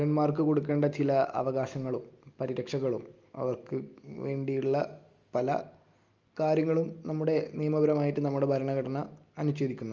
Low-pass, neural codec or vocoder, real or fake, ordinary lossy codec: 7.2 kHz; none; real; Opus, 32 kbps